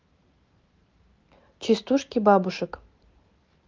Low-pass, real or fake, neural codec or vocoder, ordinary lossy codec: 7.2 kHz; real; none; Opus, 24 kbps